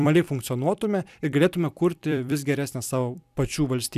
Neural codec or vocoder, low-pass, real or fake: vocoder, 44.1 kHz, 128 mel bands every 256 samples, BigVGAN v2; 14.4 kHz; fake